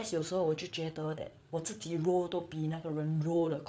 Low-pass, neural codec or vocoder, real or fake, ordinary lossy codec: none; codec, 16 kHz, 8 kbps, FreqCodec, larger model; fake; none